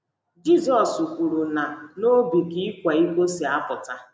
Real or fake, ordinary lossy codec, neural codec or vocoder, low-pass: real; none; none; none